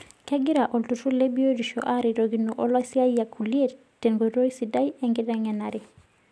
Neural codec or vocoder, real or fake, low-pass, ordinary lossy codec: none; real; none; none